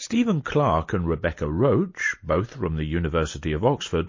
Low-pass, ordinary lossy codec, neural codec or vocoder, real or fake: 7.2 kHz; MP3, 32 kbps; none; real